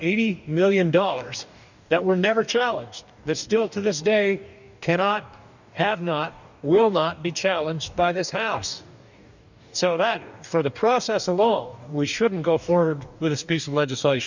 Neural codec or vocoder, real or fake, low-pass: codec, 44.1 kHz, 2.6 kbps, DAC; fake; 7.2 kHz